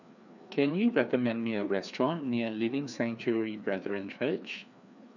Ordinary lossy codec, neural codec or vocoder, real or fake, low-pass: none; codec, 16 kHz, 2 kbps, FreqCodec, larger model; fake; 7.2 kHz